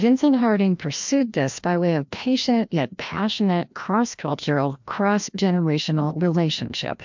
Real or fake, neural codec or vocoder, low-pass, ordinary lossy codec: fake; codec, 16 kHz, 1 kbps, FreqCodec, larger model; 7.2 kHz; MP3, 64 kbps